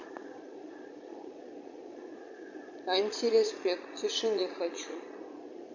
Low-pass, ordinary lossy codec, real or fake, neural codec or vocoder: 7.2 kHz; AAC, 48 kbps; fake; vocoder, 22.05 kHz, 80 mel bands, Vocos